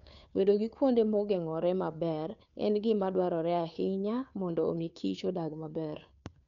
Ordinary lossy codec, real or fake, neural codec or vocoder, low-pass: none; fake; codec, 16 kHz, 2 kbps, FunCodec, trained on Chinese and English, 25 frames a second; 7.2 kHz